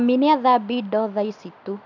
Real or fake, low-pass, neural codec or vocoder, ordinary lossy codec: real; 7.2 kHz; none; none